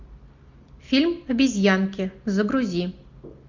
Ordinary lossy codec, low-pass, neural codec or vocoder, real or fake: MP3, 64 kbps; 7.2 kHz; none; real